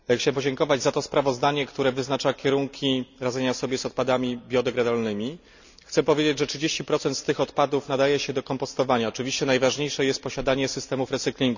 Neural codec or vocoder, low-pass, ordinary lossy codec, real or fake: none; 7.2 kHz; none; real